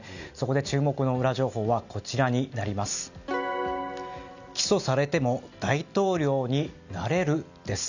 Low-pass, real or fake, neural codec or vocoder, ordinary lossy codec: 7.2 kHz; real; none; none